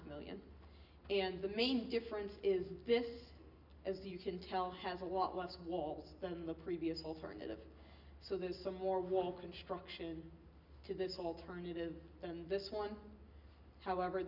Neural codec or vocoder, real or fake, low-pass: none; real; 5.4 kHz